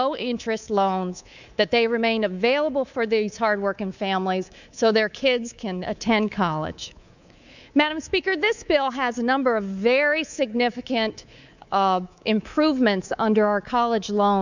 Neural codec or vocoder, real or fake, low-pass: codec, 24 kHz, 3.1 kbps, DualCodec; fake; 7.2 kHz